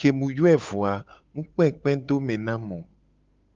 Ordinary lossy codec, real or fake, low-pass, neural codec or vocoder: Opus, 32 kbps; fake; 7.2 kHz; codec, 16 kHz, 8 kbps, FunCodec, trained on Chinese and English, 25 frames a second